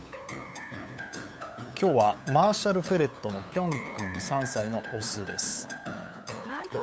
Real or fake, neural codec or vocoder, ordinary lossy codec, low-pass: fake; codec, 16 kHz, 8 kbps, FunCodec, trained on LibriTTS, 25 frames a second; none; none